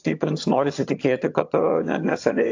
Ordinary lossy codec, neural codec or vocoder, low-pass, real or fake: AAC, 48 kbps; vocoder, 22.05 kHz, 80 mel bands, HiFi-GAN; 7.2 kHz; fake